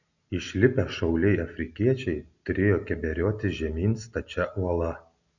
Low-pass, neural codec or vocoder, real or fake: 7.2 kHz; none; real